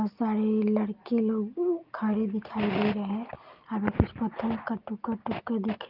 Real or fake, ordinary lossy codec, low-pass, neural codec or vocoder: real; Opus, 32 kbps; 5.4 kHz; none